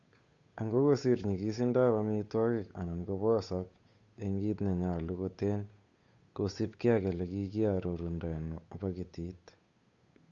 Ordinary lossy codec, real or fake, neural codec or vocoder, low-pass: Opus, 64 kbps; fake; codec, 16 kHz, 8 kbps, FunCodec, trained on Chinese and English, 25 frames a second; 7.2 kHz